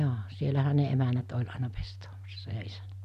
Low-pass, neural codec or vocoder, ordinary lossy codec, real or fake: 14.4 kHz; none; none; real